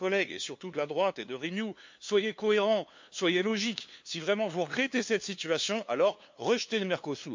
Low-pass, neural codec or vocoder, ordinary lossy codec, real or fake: 7.2 kHz; codec, 16 kHz, 2 kbps, FunCodec, trained on LibriTTS, 25 frames a second; MP3, 48 kbps; fake